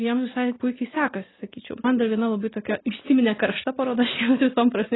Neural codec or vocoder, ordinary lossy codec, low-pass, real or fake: none; AAC, 16 kbps; 7.2 kHz; real